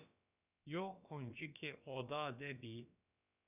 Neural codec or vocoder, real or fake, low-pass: codec, 16 kHz, about 1 kbps, DyCAST, with the encoder's durations; fake; 3.6 kHz